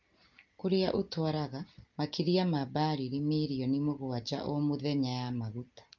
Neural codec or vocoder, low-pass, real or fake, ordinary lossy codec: none; 7.2 kHz; real; Opus, 32 kbps